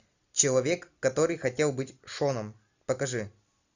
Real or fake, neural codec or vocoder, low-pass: real; none; 7.2 kHz